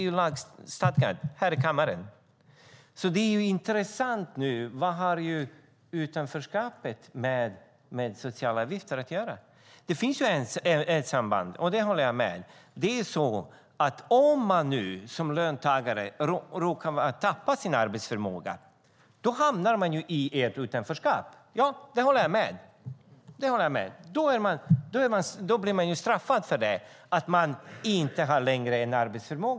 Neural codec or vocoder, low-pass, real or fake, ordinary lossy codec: none; none; real; none